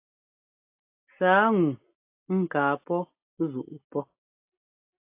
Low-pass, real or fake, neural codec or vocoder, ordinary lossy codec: 3.6 kHz; real; none; AAC, 32 kbps